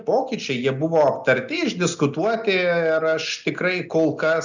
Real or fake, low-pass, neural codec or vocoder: real; 7.2 kHz; none